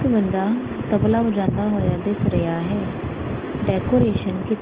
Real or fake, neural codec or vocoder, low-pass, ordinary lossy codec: real; none; 3.6 kHz; Opus, 16 kbps